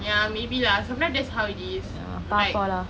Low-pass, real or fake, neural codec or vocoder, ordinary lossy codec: none; real; none; none